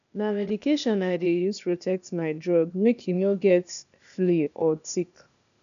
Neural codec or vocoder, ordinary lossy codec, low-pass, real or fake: codec, 16 kHz, 0.8 kbps, ZipCodec; none; 7.2 kHz; fake